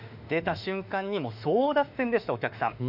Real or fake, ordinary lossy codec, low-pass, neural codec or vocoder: fake; none; 5.4 kHz; autoencoder, 48 kHz, 32 numbers a frame, DAC-VAE, trained on Japanese speech